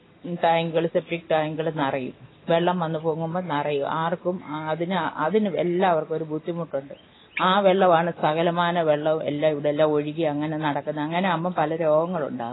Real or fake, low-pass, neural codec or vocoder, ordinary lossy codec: real; 7.2 kHz; none; AAC, 16 kbps